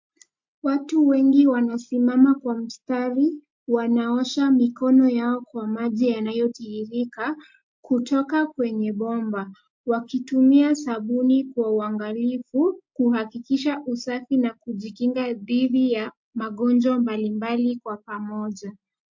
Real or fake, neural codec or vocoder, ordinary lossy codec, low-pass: real; none; MP3, 48 kbps; 7.2 kHz